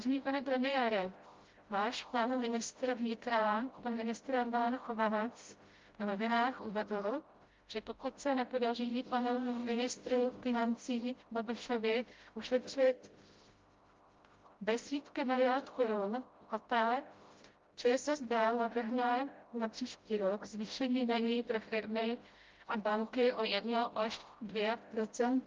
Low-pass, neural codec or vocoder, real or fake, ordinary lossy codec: 7.2 kHz; codec, 16 kHz, 0.5 kbps, FreqCodec, smaller model; fake; Opus, 32 kbps